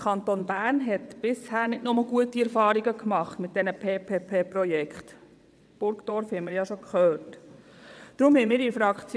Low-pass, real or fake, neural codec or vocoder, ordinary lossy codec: none; fake; vocoder, 22.05 kHz, 80 mel bands, WaveNeXt; none